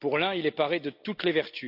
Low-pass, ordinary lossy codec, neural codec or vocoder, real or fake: 5.4 kHz; AAC, 48 kbps; none; real